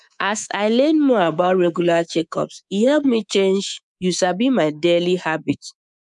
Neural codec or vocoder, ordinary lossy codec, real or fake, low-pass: codec, 24 kHz, 3.1 kbps, DualCodec; none; fake; 10.8 kHz